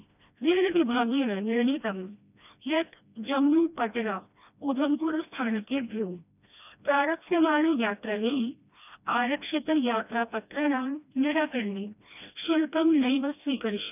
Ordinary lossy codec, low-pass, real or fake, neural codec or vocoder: none; 3.6 kHz; fake; codec, 16 kHz, 1 kbps, FreqCodec, smaller model